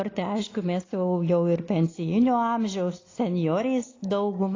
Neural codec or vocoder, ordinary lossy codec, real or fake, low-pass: none; AAC, 32 kbps; real; 7.2 kHz